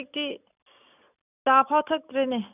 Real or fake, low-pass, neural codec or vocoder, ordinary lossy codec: real; 3.6 kHz; none; none